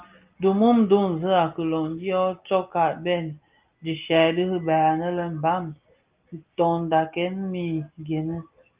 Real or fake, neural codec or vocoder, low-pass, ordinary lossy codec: real; none; 3.6 kHz; Opus, 32 kbps